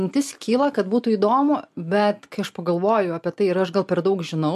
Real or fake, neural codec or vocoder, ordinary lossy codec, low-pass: real; none; MP3, 64 kbps; 14.4 kHz